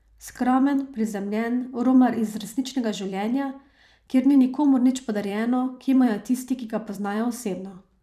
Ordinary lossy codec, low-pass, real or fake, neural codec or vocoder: none; 14.4 kHz; fake; vocoder, 44.1 kHz, 128 mel bands every 256 samples, BigVGAN v2